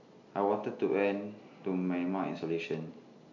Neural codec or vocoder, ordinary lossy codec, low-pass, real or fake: none; MP3, 48 kbps; 7.2 kHz; real